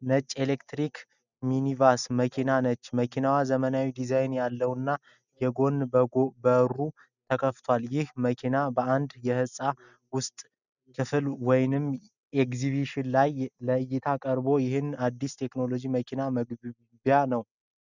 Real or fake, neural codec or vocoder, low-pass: real; none; 7.2 kHz